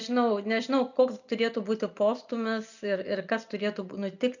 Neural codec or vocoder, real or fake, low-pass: none; real; 7.2 kHz